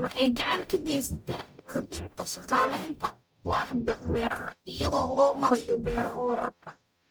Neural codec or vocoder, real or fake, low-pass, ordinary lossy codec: codec, 44.1 kHz, 0.9 kbps, DAC; fake; none; none